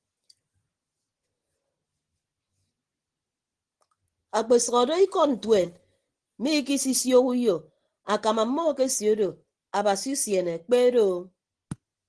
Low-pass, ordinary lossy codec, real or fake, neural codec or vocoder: 9.9 kHz; Opus, 16 kbps; real; none